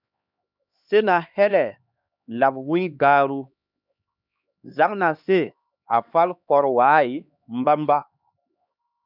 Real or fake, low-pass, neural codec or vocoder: fake; 5.4 kHz; codec, 16 kHz, 2 kbps, X-Codec, HuBERT features, trained on LibriSpeech